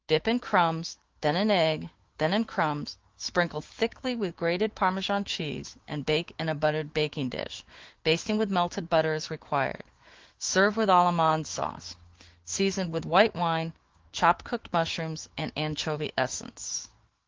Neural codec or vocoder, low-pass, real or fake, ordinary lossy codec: autoencoder, 48 kHz, 128 numbers a frame, DAC-VAE, trained on Japanese speech; 7.2 kHz; fake; Opus, 16 kbps